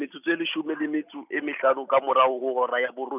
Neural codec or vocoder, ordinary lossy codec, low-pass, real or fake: none; none; 3.6 kHz; real